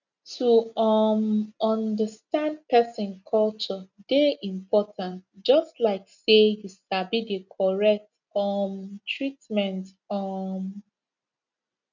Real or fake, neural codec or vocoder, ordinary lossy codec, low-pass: real; none; none; 7.2 kHz